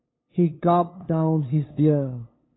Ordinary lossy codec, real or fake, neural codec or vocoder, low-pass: AAC, 16 kbps; fake; codec, 16 kHz, 2 kbps, FunCodec, trained on LibriTTS, 25 frames a second; 7.2 kHz